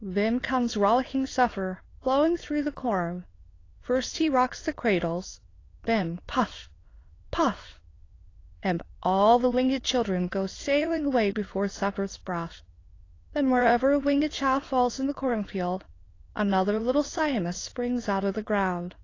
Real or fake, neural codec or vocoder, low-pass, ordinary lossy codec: fake; autoencoder, 22.05 kHz, a latent of 192 numbers a frame, VITS, trained on many speakers; 7.2 kHz; AAC, 32 kbps